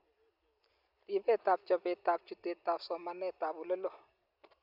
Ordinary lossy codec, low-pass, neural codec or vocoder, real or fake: none; 5.4 kHz; none; real